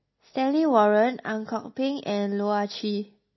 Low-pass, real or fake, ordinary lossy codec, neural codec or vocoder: 7.2 kHz; real; MP3, 24 kbps; none